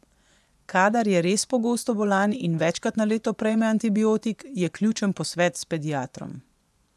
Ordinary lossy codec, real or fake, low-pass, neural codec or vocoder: none; fake; none; vocoder, 24 kHz, 100 mel bands, Vocos